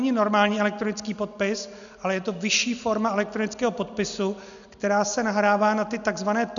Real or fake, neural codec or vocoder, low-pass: real; none; 7.2 kHz